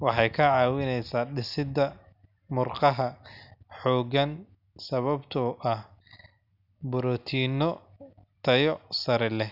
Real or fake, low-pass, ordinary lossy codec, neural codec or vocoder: real; 5.4 kHz; none; none